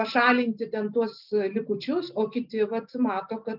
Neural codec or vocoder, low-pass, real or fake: none; 5.4 kHz; real